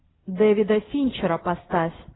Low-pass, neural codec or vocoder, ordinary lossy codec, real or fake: 7.2 kHz; none; AAC, 16 kbps; real